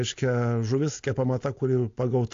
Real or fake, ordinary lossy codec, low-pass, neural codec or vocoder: real; AAC, 48 kbps; 7.2 kHz; none